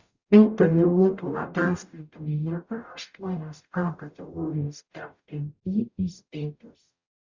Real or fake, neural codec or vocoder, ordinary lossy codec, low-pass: fake; codec, 44.1 kHz, 0.9 kbps, DAC; Opus, 64 kbps; 7.2 kHz